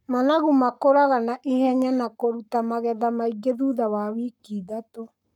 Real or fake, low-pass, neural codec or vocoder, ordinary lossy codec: fake; 19.8 kHz; codec, 44.1 kHz, 7.8 kbps, Pupu-Codec; none